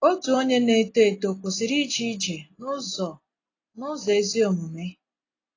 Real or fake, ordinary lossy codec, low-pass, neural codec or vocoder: real; AAC, 32 kbps; 7.2 kHz; none